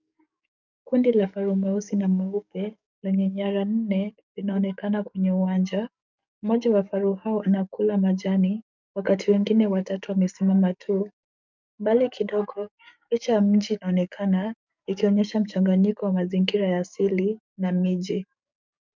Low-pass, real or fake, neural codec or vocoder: 7.2 kHz; fake; codec, 44.1 kHz, 7.8 kbps, DAC